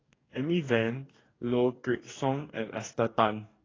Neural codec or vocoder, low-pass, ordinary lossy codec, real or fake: codec, 44.1 kHz, 2.6 kbps, DAC; 7.2 kHz; AAC, 32 kbps; fake